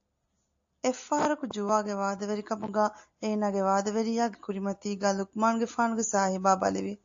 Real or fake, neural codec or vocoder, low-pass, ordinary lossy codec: real; none; 7.2 kHz; AAC, 48 kbps